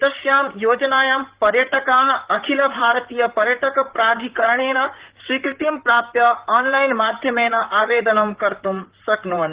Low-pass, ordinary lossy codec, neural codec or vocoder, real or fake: 3.6 kHz; Opus, 16 kbps; vocoder, 44.1 kHz, 128 mel bands, Pupu-Vocoder; fake